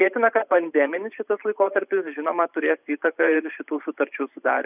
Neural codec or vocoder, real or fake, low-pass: vocoder, 44.1 kHz, 128 mel bands every 512 samples, BigVGAN v2; fake; 3.6 kHz